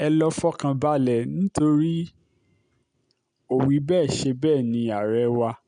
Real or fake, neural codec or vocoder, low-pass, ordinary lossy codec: real; none; 9.9 kHz; none